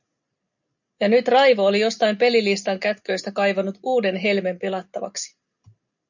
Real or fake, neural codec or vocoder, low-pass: real; none; 7.2 kHz